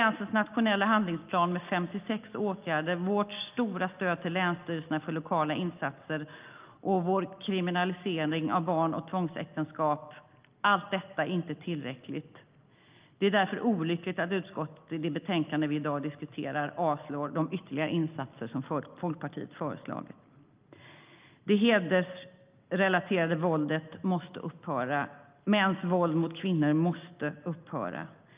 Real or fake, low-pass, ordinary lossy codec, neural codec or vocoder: real; 3.6 kHz; Opus, 24 kbps; none